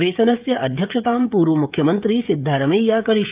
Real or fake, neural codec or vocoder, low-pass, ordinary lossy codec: fake; codec, 16 kHz, 16 kbps, FreqCodec, smaller model; 3.6 kHz; Opus, 24 kbps